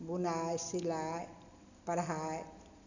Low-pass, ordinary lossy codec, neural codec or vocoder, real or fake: 7.2 kHz; none; none; real